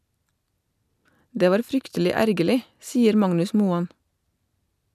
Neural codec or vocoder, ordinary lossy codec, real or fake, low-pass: none; none; real; 14.4 kHz